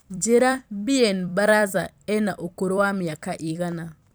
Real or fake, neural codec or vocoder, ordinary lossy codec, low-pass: fake; vocoder, 44.1 kHz, 128 mel bands every 256 samples, BigVGAN v2; none; none